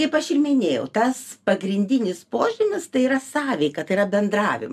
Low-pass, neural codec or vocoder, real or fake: 14.4 kHz; none; real